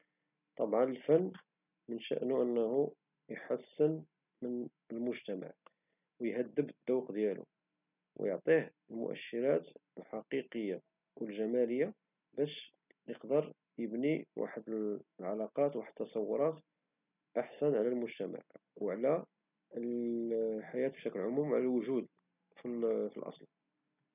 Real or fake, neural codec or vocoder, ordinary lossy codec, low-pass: real; none; none; 3.6 kHz